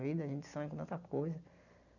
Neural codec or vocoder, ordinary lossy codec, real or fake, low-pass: none; none; real; 7.2 kHz